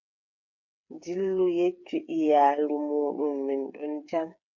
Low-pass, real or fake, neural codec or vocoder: 7.2 kHz; fake; codec, 16 kHz, 8 kbps, FreqCodec, smaller model